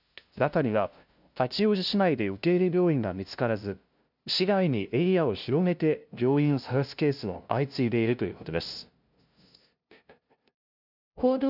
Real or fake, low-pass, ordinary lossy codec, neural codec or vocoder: fake; 5.4 kHz; none; codec, 16 kHz, 0.5 kbps, FunCodec, trained on LibriTTS, 25 frames a second